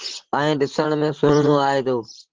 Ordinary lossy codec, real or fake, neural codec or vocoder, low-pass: Opus, 16 kbps; fake; vocoder, 44.1 kHz, 128 mel bands, Pupu-Vocoder; 7.2 kHz